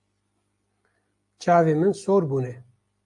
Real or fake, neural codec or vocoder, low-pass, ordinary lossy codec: real; none; 10.8 kHz; AAC, 64 kbps